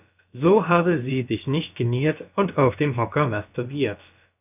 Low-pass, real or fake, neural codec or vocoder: 3.6 kHz; fake; codec, 16 kHz, about 1 kbps, DyCAST, with the encoder's durations